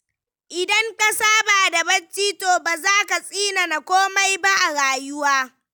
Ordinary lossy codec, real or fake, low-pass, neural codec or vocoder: none; real; none; none